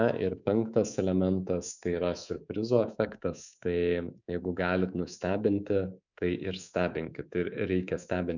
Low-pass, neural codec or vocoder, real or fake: 7.2 kHz; codec, 24 kHz, 3.1 kbps, DualCodec; fake